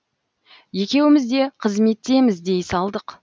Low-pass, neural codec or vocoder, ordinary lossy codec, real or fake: none; none; none; real